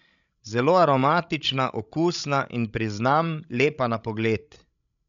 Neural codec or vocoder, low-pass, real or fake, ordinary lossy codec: codec, 16 kHz, 16 kbps, FreqCodec, larger model; 7.2 kHz; fake; none